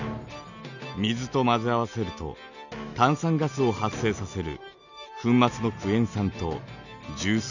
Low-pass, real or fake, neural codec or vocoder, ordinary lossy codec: 7.2 kHz; real; none; none